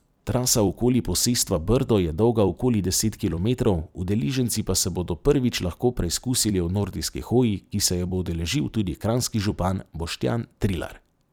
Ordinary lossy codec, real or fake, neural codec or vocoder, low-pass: none; fake; vocoder, 44.1 kHz, 128 mel bands every 256 samples, BigVGAN v2; none